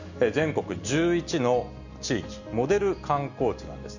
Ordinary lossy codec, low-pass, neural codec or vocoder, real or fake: none; 7.2 kHz; none; real